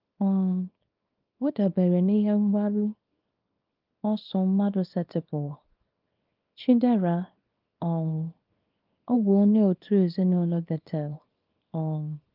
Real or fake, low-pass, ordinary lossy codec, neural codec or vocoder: fake; 5.4 kHz; Opus, 24 kbps; codec, 24 kHz, 0.9 kbps, WavTokenizer, small release